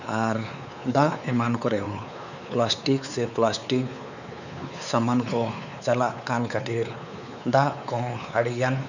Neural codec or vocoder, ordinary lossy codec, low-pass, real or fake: codec, 16 kHz, 4 kbps, X-Codec, WavLM features, trained on Multilingual LibriSpeech; none; 7.2 kHz; fake